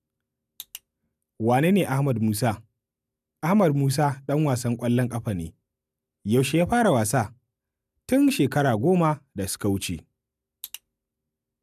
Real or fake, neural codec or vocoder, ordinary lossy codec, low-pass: real; none; none; 14.4 kHz